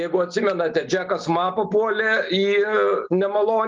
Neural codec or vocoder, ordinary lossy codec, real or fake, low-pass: none; Opus, 32 kbps; real; 7.2 kHz